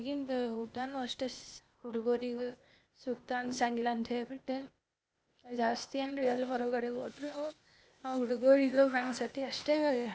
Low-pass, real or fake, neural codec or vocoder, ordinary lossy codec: none; fake; codec, 16 kHz, 0.8 kbps, ZipCodec; none